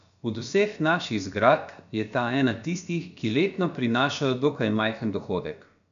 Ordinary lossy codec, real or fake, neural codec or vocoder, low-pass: none; fake; codec, 16 kHz, about 1 kbps, DyCAST, with the encoder's durations; 7.2 kHz